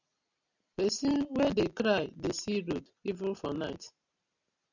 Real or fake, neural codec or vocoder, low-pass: real; none; 7.2 kHz